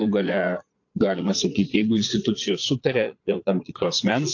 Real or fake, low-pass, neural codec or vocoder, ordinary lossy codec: fake; 7.2 kHz; vocoder, 22.05 kHz, 80 mel bands, Vocos; AAC, 48 kbps